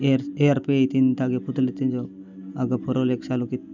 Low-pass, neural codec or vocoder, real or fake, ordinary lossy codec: 7.2 kHz; none; real; none